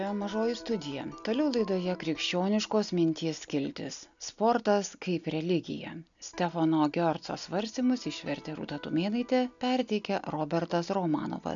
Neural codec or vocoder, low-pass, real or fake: none; 7.2 kHz; real